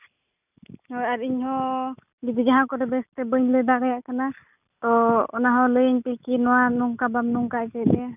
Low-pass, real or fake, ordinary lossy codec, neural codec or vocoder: 3.6 kHz; real; none; none